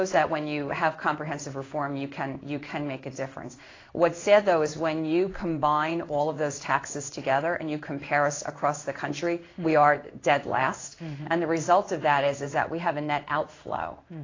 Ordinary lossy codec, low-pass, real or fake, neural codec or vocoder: AAC, 32 kbps; 7.2 kHz; fake; codec, 16 kHz in and 24 kHz out, 1 kbps, XY-Tokenizer